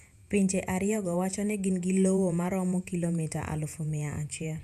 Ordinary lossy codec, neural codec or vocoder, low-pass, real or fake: none; vocoder, 48 kHz, 128 mel bands, Vocos; 14.4 kHz; fake